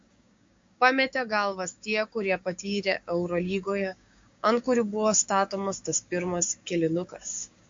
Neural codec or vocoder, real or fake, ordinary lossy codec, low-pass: codec, 16 kHz, 6 kbps, DAC; fake; MP3, 48 kbps; 7.2 kHz